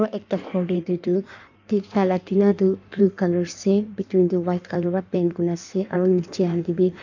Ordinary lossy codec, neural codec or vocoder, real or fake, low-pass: none; codec, 16 kHz in and 24 kHz out, 1.1 kbps, FireRedTTS-2 codec; fake; 7.2 kHz